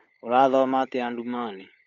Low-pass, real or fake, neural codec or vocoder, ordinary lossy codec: 7.2 kHz; fake; codec, 16 kHz, 16 kbps, FunCodec, trained on LibriTTS, 50 frames a second; none